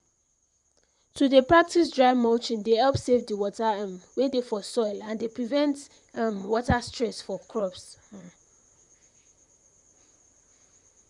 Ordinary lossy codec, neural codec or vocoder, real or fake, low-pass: none; vocoder, 44.1 kHz, 128 mel bands, Pupu-Vocoder; fake; 10.8 kHz